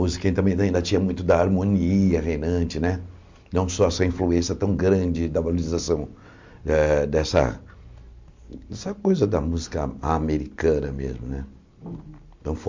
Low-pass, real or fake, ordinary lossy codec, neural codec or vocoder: 7.2 kHz; real; MP3, 64 kbps; none